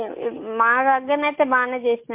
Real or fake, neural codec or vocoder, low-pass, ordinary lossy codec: real; none; 3.6 kHz; MP3, 24 kbps